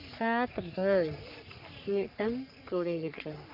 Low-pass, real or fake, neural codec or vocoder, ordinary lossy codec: 5.4 kHz; fake; codec, 44.1 kHz, 3.4 kbps, Pupu-Codec; none